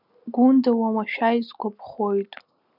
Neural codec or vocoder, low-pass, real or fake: none; 5.4 kHz; real